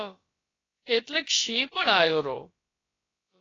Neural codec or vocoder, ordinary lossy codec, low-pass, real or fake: codec, 16 kHz, about 1 kbps, DyCAST, with the encoder's durations; AAC, 32 kbps; 7.2 kHz; fake